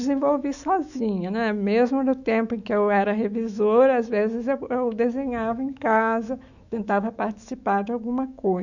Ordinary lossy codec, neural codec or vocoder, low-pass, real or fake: none; autoencoder, 48 kHz, 128 numbers a frame, DAC-VAE, trained on Japanese speech; 7.2 kHz; fake